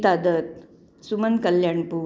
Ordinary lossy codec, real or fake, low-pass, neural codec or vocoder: none; real; none; none